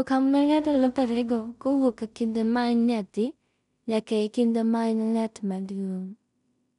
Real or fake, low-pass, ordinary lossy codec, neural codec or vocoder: fake; 10.8 kHz; none; codec, 16 kHz in and 24 kHz out, 0.4 kbps, LongCat-Audio-Codec, two codebook decoder